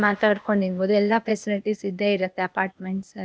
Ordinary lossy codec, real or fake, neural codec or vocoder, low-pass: none; fake; codec, 16 kHz, 0.8 kbps, ZipCodec; none